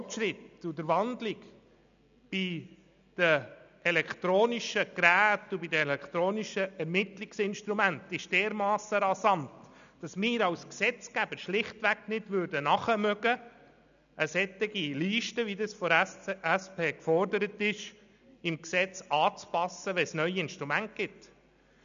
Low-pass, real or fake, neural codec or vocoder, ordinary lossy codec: 7.2 kHz; real; none; none